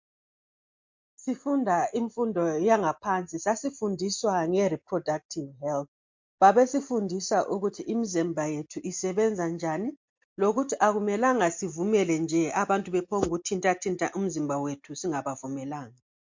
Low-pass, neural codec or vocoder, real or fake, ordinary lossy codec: 7.2 kHz; none; real; MP3, 48 kbps